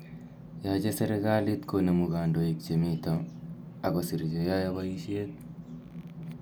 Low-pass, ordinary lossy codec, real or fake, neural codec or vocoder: none; none; real; none